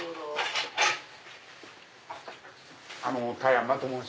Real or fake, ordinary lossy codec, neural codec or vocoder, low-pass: real; none; none; none